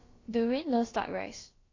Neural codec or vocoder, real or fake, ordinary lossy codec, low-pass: codec, 16 kHz, about 1 kbps, DyCAST, with the encoder's durations; fake; AAC, 32 kbps; 7.2 kHz